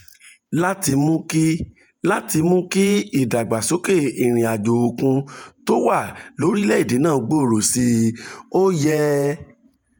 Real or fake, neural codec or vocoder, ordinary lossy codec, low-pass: fake; vocoder, 48 kHz, 128 mel bands, Vocos; none; none